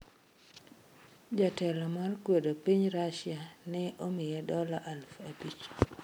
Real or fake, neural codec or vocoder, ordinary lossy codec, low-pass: real; none; none; none